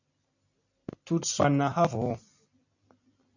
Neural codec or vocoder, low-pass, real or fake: none; 7.2 kHz; real